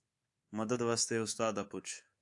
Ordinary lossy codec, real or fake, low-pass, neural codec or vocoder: AAC, 64 kbps; fake; 10.8 kHz; codec, 24 kHz, 3.1 kbps, DualCodec